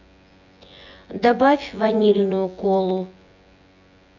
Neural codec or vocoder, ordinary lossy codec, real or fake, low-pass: vocoder, 24 kHz, 100 mel bands, Vocos; none; fake; 7.2 kHz